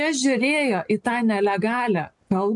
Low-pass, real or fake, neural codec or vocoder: 10.8 kHz; real; none